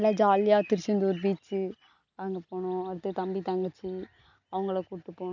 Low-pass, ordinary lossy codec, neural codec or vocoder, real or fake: 7.2 kHz; none; none; real